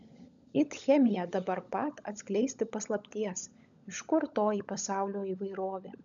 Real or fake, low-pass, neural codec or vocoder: fake; 7.2 kHz; codec, 16 kHz, 16 kbps, FunCodec, trained on LibriTTS, 50 frames a second